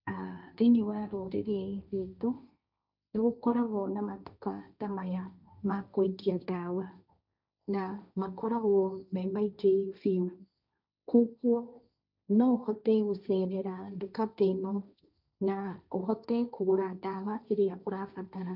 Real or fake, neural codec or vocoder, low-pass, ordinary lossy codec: fake; codec, 16 kHz, 1.1 kbps, Voila-Tokenizer; 5.4 kHz; none